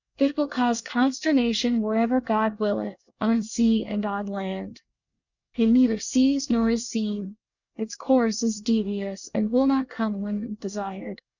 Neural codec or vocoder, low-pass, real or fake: codec, 24 kHz, 1 kbps, SNAC; 7.2 kHz; fake